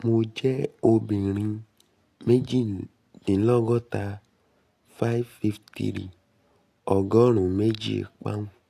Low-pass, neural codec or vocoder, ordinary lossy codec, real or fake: 14.4 kHz; none; AAC, 64 kbps; real